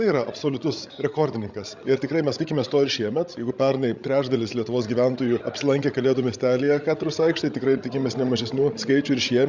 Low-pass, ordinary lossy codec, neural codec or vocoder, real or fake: 7.2 kHz; Opus, 64 kbps; codec, 16 kHz, 16 kbps, FreqCodec, larger model; fake